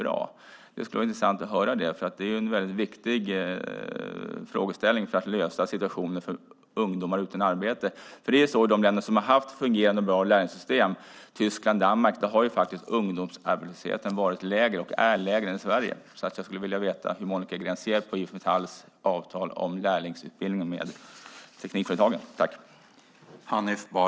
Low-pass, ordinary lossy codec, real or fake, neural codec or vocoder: none; none; real; none